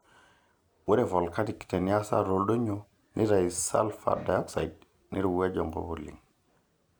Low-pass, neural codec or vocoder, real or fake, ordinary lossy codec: none; none; real; none